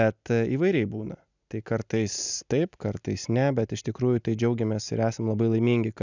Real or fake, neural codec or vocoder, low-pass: real; none; 7.2 kHz